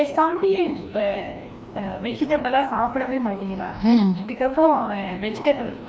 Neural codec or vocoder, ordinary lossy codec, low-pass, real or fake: codec, 16 kHz, 1 kbps, FreqCodec, larger model; none; none; fake